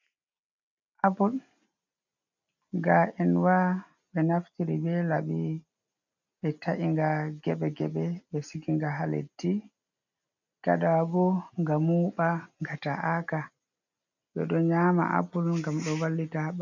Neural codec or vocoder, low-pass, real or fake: none; 7.2 kHz; real